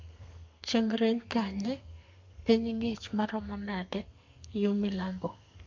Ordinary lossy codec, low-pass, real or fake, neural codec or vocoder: MP3, 64 kbps; 7.2 kHz; fake; codec, 32 kHz, 1.9 kbps, SNAC